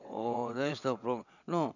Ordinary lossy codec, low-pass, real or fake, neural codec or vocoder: none; 7.2 kHz; fake; vocoder, 22.05 kHz, 80 mel bands, WaveNeXt